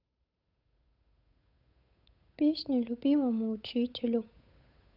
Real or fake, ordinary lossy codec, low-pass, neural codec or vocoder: fake; none; 5.4 kHz; codec, 16 kHz, 8 kbps, FunCodec, trained on Chinese and English, 25 frames a second